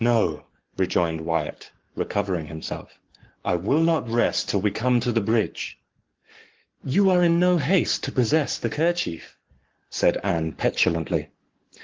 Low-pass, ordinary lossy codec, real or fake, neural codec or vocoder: 7.2 kHz; Opus, 16 kbps; fake; codec, 44.1 kHz, 7.8 kbps, Pupu-Codec